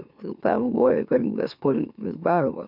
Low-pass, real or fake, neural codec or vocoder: 5.4 kHz; fake; autoencoder, 44.1 kHz, a latent of 192 numbers a frame, MeloTTS